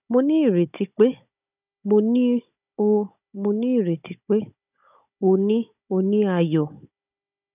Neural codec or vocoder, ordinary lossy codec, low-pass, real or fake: codec, 16 kHz, 16 kbps, FunCodec, trained on Chinese and English, 50 frames a second; none; 3.6 kHz; fake